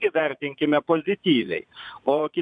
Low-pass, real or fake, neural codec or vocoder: 9.9 kHz; fake; vocoder, 22.05 kHz, 80 mel bands, Vocos